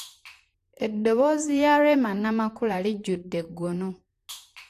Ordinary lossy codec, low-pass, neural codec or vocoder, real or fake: AAC, 64 kbps; 14.4 kHz; vocoder, 44.1 kHz, 128 mel bands, Pupu-Vocoder; fake